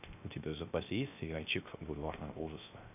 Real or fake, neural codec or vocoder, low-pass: fake; codec, 16 kHz, 0.3 kbps, FocalCodec; 3.6 kHz